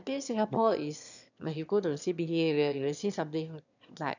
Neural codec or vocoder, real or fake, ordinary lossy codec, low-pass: autoencoder, 22.05 kHz, a latent of 192 numbers a frame, VITS, trained on one speaker; fake; none; 7.2 kHz